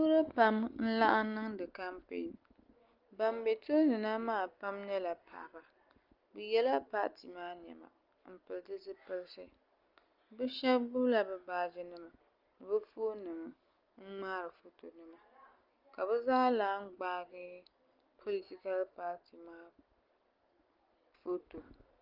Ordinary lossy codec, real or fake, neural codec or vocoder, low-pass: Opus, 32 kbps; real; none; 5.4 kHz